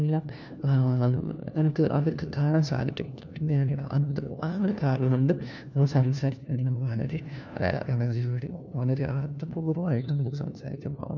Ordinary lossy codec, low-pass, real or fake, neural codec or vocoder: none; 7.2 kHz; fake; codec, 16 kHz, 1 kbps, FunCodec, trained on LibriTTS, 50 frames a second